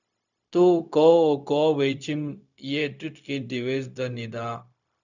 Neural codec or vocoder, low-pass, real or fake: codec, 16 kHz, 0.4 kbps, LongCat-Audio-Codec; 7.2 kHz; fake